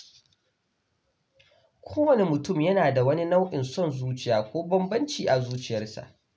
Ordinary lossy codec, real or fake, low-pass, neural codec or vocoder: none; real; none; none